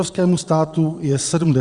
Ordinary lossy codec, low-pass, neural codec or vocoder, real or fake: Opus, 64 kbps; 9.9 kHz; vocoder, 22.05 kHz, 80 mel bands, Vocos; fake